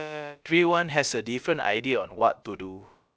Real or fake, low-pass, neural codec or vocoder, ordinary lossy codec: fake; none; codec, 16 kHz, about 1 kbps, DyCAST, with the encoder's durations; none